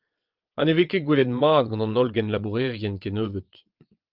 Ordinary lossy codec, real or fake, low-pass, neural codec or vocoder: Opus, 24 kbps; fake; 5.4 kHz; vocoder, 22.05 kHz, 80 mel bands, WaveNeXt